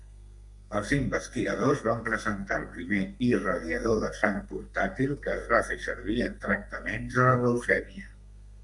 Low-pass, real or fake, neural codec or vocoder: 10.8 kHz; fake; codec, 32 kHz, 1.9 kbps, SNAC